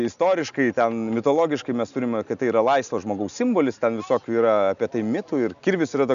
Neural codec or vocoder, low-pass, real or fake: none; 7.2 kHz; real